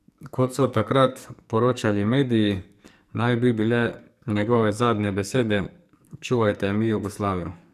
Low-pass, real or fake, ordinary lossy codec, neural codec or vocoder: 14.4 kHz; fake; none; codec, 44.1 kHz, 2.6 kbps, SNAC